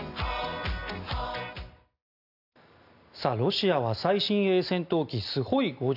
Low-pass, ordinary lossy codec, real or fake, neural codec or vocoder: 5.4 kHz; none; real; none